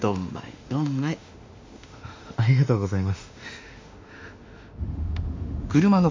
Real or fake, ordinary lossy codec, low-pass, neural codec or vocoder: fake; MP3, 48 kbps; 7.2 kHz; autoencoder, 48 kHz, 32 numbers a frame, DAC-VAE, trained on Japanese speech